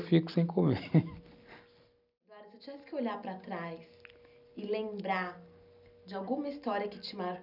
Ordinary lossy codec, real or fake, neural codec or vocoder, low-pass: none; real; none; 5.4 kHz